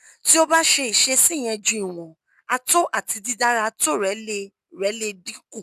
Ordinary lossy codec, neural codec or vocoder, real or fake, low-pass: none; none; real; 14.4 kHz